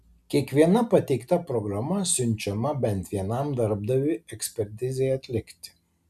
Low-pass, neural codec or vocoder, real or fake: 14.4 kHz; none; real